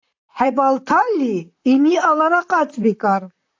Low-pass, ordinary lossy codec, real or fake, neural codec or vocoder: 7.2 kHz; AAC, 48 kbps; fake; vocoder, 44.1 kHz, 128 mel bands, Pupu-Vocoder